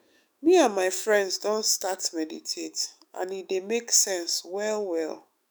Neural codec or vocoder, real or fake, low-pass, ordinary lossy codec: autoencoder, 48 kHz, 128 numbers a frame, DAC-VAE, trained on Japanese speech; fake; none; none